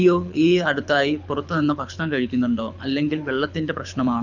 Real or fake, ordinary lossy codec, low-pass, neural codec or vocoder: fake; none; 7.2 kHz; codec, 24 kHz, 6 kbps, HILCodec